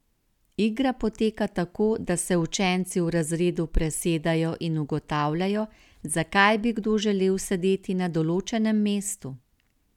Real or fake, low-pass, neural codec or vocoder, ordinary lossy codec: real; 19.8 kHz; none; none